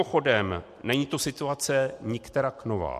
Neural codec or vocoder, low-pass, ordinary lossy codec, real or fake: none; 14.4 kHz; MP3, 64 kbps; real